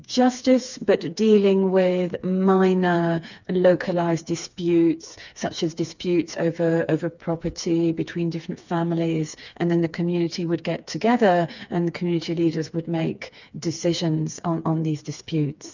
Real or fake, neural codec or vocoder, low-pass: fake; codec, 16 kHz, 4 kbps, FreqCodec, smaller model; 7.2 kHz